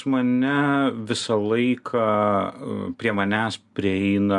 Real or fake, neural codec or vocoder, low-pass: real; none; 10.8 kHz